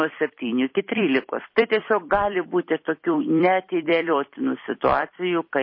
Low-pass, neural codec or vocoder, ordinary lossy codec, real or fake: 5.4 kHz; vocoder, 44.1 kHz, 128 mel bands every 256 samples, BigVGAN v2; MP3, 24 kbps; fake